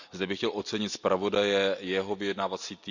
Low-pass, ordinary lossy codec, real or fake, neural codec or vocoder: 7.2 kHz; none; real; none